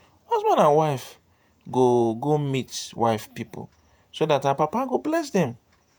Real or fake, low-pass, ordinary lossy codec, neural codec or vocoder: fake; none; none; vocoder, 48 kHz, 128 mel bands, Vocos